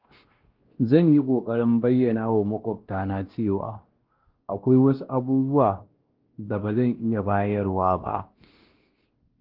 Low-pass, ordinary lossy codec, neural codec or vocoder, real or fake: 5.4 kHz; Opus, 16 kbps; codec, 16 kHz, 1 kbps, X-Codec, WavLM features, trained on Multilingual LibriSpeech; fake